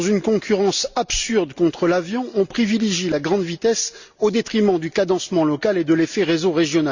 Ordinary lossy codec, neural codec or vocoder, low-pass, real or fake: Opus, 64 kbps; none; 7.2 kHz; real